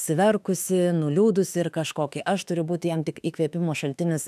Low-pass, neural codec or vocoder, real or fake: 14.4 kHz; autoencoder, 48 kHz, 32 numbers a frame, DAC-VAE, trained on Japanese speech; fake